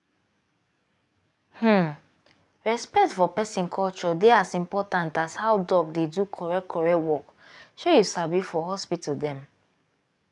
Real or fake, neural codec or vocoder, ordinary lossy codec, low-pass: fake; codec, 44.1 kHz, 7.8 kbps, DAC; none; 10.8 kHz